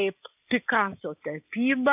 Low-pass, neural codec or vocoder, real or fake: 3.6 kHz; none; real